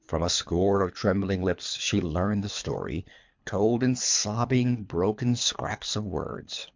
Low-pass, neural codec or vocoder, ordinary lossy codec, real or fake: 7.2 kHz; codec, 24 kHz, 3 kbps, HILCodec; MP3, 64 kbps; fake